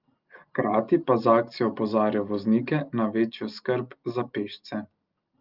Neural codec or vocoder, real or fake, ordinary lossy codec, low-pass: none; real; Opus, 24 kbps; 5.4 kHz